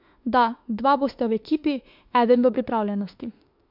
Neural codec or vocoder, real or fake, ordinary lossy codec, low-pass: autoencoder, 48 kHz, 32 numbers a frame, DAC-VAE, trained on Japanese speech; fake; MP3, 48 kbps; 5.4 kHz